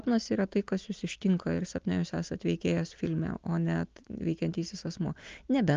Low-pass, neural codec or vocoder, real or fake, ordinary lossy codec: 7.2 kHz; none; real; Opus, 24 kbps